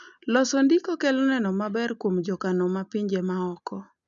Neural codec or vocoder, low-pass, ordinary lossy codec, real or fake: none; 7.2 kHz; none; real